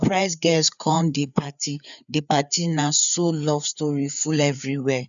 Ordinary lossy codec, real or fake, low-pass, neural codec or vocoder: none; fake; 7.2 kHz; codec, 16 kHz, 4 kbps, FreqCodec, larger model